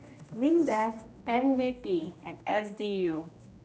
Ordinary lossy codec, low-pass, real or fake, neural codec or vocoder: none; none; fake; codec, 16 kHz, 1 kbps, X-Codec, HuBERT features, trained on general audio